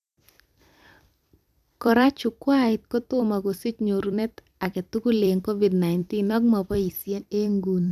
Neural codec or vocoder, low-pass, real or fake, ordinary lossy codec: vocoder, 44.1 kHz, 128 mel bands every 256 samples, BigVGAN v2; 14.4 kHz; fake; none